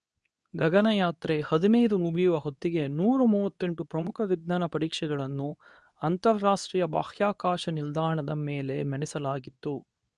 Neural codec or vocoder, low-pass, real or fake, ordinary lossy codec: codec, 24 kHz, 0.9 kbps, WavTokenizer, medium speech release version 2; 10.8 kHz; fake; none